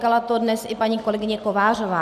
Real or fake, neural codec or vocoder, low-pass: real; none; 14.4 kHz